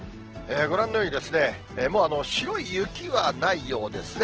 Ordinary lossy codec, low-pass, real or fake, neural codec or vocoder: Opus, 16 kbps; 7.2 kHz; real; none